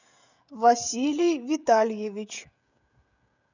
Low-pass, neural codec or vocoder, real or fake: 7.2 kHz; codec, 16 kHz, 16 kbps, FreqCodec, smaller model; fake